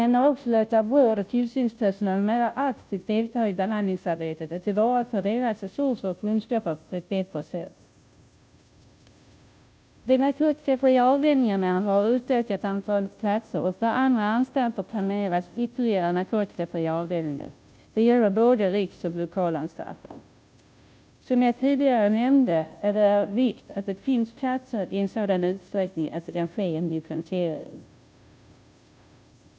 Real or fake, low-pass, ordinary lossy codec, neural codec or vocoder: fake; none; none; codec, 16 kHz, 0.5 kbps, FunCodec, trained on Chinese and English, 25 frames a second